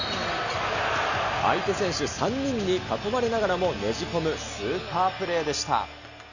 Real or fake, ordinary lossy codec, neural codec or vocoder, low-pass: real; none; none; 7.2 kHz